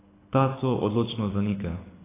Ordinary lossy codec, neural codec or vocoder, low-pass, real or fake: AAC, 16 kbps; codec, 24 kHz, 6 kbps, HILCodec; 3.6 kHz; fake